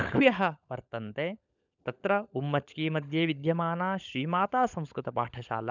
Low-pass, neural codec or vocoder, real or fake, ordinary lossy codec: 7.2 kHz; codec, 16 kHz, 16 kbps, FunCodec, trained on LibriTTS, 50 frames a second; fake; none